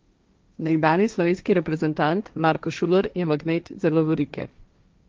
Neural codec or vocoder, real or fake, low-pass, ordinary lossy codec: codec, 16 kHz, 1.1 kbps, Voila-Tokenizer; fake; 7.2 kHz; Opus, 24 kbps